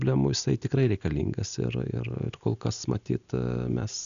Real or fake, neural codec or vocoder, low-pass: real; none; 7.2 kHz